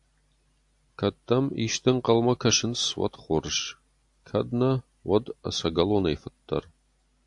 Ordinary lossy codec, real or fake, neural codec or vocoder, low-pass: AAC, 64 kbps; real; none; 10.8 kHz